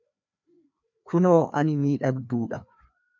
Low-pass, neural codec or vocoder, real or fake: 7.2 kHz; codec, 16 kHz, 2 kbps, FreqCodec, larger model; fake